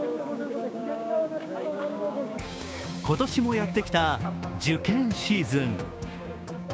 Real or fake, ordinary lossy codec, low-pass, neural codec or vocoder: fake; none; none; codec, 16 kHz, 6 kbps, DAC